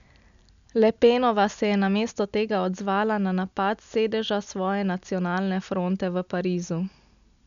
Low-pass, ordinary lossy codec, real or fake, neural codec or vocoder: 7.2 kHz; none; real; none